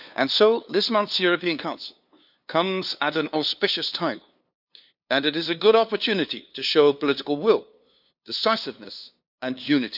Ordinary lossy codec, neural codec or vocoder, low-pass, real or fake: none; codec, 16 kHz, 2 kbps, FunCodec, trained on LibriTTS, 25 frames a second; 5.4 kHz; fake